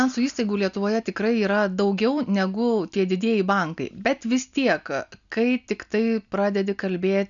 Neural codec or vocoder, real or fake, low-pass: none; real; 7.2 kHz